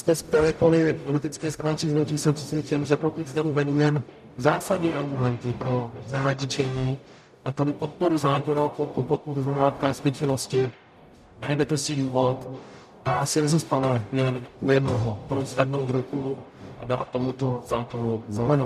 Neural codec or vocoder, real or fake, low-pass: codec, 44.1 kHz, 0.9 kbps, DAC; fake; 14.4 kHz